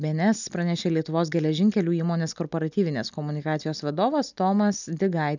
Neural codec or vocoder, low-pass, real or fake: none; 7.2 kHz; real